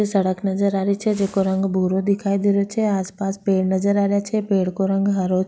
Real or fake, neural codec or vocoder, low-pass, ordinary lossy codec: real; none; none; none